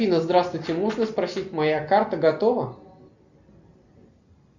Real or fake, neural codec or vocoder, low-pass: real; none; 7.2 kHz